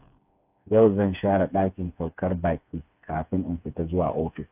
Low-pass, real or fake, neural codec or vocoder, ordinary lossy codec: 3.6 kHz; fake; codec, 16 kHz, 4 kbps, FreqCodec, smaller model; AAC, 32 kbps